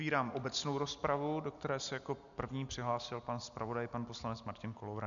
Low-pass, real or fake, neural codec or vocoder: 7.2 kHz; real; none